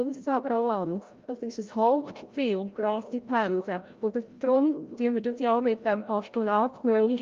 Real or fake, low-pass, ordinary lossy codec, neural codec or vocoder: fake; 7.2 kHz; Opus, 32 kbps; codec, 16 kHz, 0.5 kbps, FreqCodec, larger model